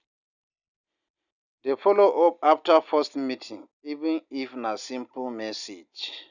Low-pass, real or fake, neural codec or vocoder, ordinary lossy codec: 7.2 kHz; real; none; none